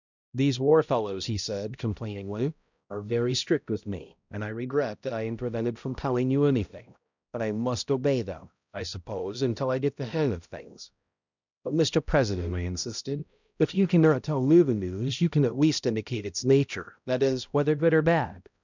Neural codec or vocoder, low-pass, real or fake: codec, 16 kHz, 0.5 kbps, X-Codec, HuBERT features, trained on balanced general audio; 7.2 kHz; fake